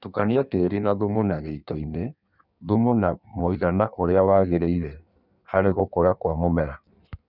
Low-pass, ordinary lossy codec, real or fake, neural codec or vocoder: 5.4 kHz; none; fake; codec, 16 kHz in and 24 kHz out, 1.1 kbps, FireRedTTS-2 codec